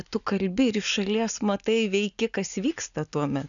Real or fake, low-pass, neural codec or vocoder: real; 7.2 kHz; none